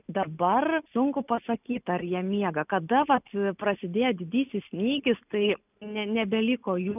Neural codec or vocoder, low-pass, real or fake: none; 3.6 kHz; real